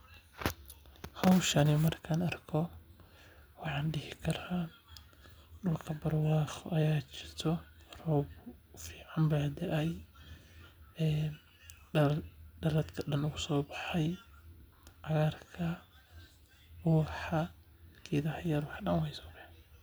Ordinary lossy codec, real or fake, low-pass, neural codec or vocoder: none; real; none; none